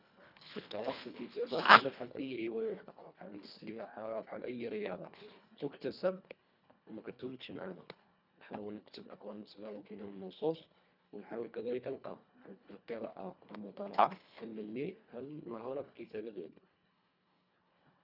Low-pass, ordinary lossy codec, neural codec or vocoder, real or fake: 5.4 kHz; none; codec, 24 kHz, 1.5 kbps, HILCodec; fake